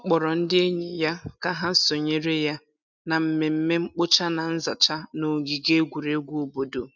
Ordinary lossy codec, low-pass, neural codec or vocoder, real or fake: none; 7.2 kHz; none; real